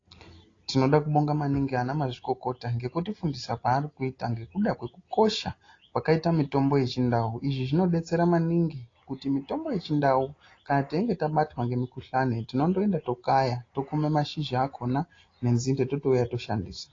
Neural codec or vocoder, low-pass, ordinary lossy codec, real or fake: none; 7.2 kHz; AAC, 32 kbps; real